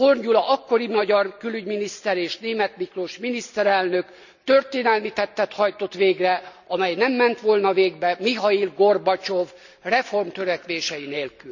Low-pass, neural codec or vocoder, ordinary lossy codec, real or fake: 7.2 kHz; none; none; real